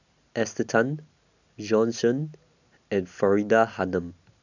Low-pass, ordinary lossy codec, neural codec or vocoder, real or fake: 7.2 kHz; none; none; real